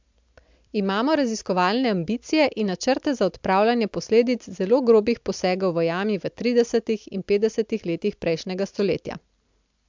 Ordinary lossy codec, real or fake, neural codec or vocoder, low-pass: MP3, 64 kbps; real; none; 7.2 kHz